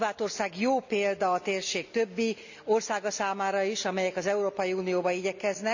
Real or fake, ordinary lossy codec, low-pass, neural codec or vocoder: real; none; 7.2 kHz; none